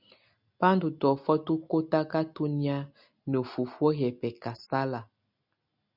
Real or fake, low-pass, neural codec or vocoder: real; 5.4 kHz; none